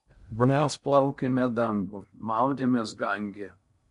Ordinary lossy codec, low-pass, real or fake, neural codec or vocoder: MP3, 64 kbps; 10.8 kHz; fake; codec, 16 kHz in and 24 kHz out, 0.6 kbps, FocalCodec, streaming, 4096 codes